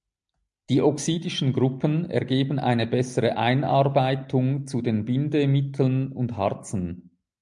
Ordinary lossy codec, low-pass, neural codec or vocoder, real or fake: MP3, 64 kbps; 10.8 kHz; none; real